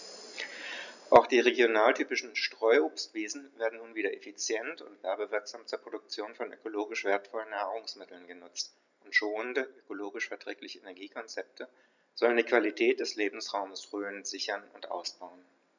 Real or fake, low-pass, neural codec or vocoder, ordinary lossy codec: real; 7.2 kHz; none; none